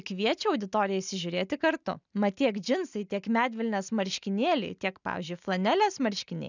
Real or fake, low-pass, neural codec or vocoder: real; 7.2 kHz; none